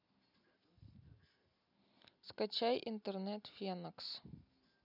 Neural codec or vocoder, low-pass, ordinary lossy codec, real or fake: none; 5.4 kHz; none; real